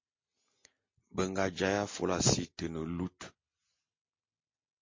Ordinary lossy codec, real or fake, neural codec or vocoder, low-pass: MP3, 32 kbps; real; none; 7.2 kHz